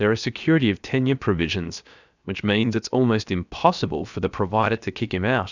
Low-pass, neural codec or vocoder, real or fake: 7.2 kHz; codec, 16 kHz, 0.7 kbps, FocalCodec; fake